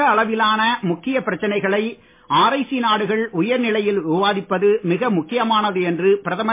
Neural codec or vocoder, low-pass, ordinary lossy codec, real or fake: none; 3.6 kHz; MP3, 24 kbps; real